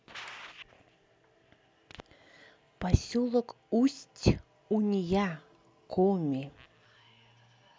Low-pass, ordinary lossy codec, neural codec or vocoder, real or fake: none; none; none; real